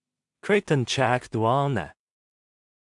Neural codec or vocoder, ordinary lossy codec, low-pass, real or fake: codec, 16 kHz in and 24 kHz out, 0.4 kbps, LongCat-Audio-Codec, two codebook decoder; AAC, 64 kbps; 10.8 kHz; fake